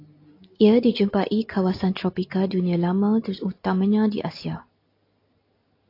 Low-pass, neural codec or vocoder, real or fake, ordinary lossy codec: 5.4 kHz; none; real; AAC, 32 kbps